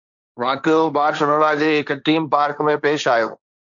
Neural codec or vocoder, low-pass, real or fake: codec, 16 kHz, 1.1 kbps, Voila-Tokenizer; 7.2 kHz; fake